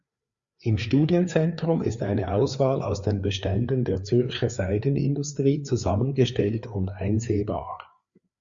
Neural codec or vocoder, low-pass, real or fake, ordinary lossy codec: codec, 16 kHz, 4 kbps, FreqCodec, larger model; 7.2 kHz; fake; Opus, 64 kbps